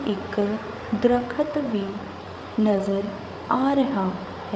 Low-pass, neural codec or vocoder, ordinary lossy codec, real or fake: none; codec, 16 kHz, 8 kbps, FreqCodec, larger model; none; fake